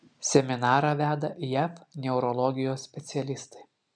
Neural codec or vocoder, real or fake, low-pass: none; real; 9.9 kHz